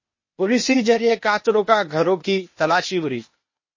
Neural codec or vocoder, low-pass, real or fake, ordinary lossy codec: codec, 16 kHz, 0.8 kbps, ZipCodec; 7.2 kHz; fake; MP3, 32 kbps